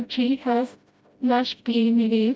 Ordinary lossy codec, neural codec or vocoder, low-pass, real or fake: none; codec, 16 kHz, 0.5 kbps, FreqCodec, smaller model; none; fake